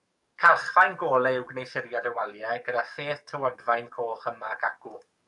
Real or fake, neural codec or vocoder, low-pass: fake; codec, 44.1 kHz, 7.8 kbps, DAC; 10.8 kHz